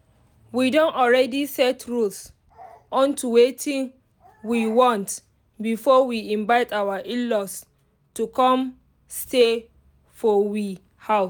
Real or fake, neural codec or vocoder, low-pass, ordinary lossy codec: real; none; none; none